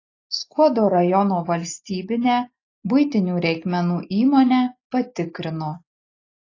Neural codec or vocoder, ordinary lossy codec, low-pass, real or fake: none; AAC, 32 kbps; 7.2 kHz; real